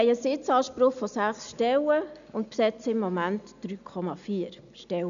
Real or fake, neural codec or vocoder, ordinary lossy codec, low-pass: real; none; MP3, 96 kbps; 7.2 kHz